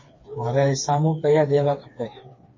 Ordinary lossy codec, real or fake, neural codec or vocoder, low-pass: MP3, 32 kbps; fake; codec, 16 kHz, 4 kbps, FreqCodec, smaller model; 7.2 kHz